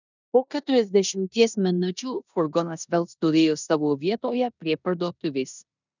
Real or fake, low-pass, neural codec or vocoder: fake; 7.2 kHz; codec, 16 kHz in and 24 kHz out, 0.9 kbps, LongCat-Audio-Codec, four codebook decoder